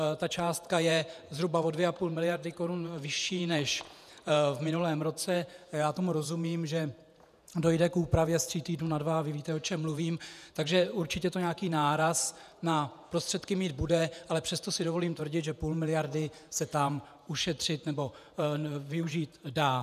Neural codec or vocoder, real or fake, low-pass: vocoder, 48 kHz, 128 mel bands, Vocos; fake; 14.4 kHz